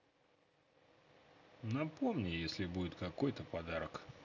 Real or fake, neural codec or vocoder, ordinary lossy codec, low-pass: real; none; none; 7.2 kHz